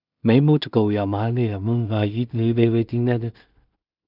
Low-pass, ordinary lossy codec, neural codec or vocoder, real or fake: 5.4 kHz; none; codec, 16 kHz in and 24 kHz out, 0.4 kbps, LongCat-Audio-Codec, two codebook decoder; fake